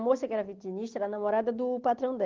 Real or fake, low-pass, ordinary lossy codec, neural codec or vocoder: real; 7.2 kHz; Opus, 16 kbps; none